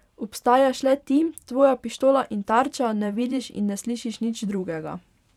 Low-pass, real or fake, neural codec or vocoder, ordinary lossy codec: 19.8 kHz; fake; vocoder, 44.1 kHz, 128 mel bands every 512 samples, BigVGAN v2; none